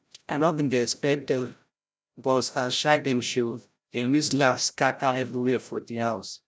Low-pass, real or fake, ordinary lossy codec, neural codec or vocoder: none; fake; none; codec, 16 kHz, 0.5 kbps, FreqCodec, larger model